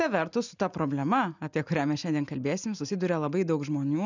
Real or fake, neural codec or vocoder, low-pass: real; none; 7.2 kHz